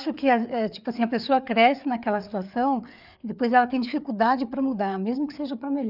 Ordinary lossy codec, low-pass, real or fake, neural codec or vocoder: none; 5.4 kHz; fake; codec, 16 kHz, 4 kbps, FreqCodec, larger model